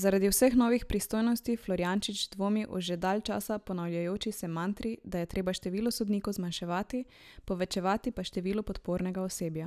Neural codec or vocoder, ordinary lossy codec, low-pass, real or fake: none; none; 14.4 kHz; real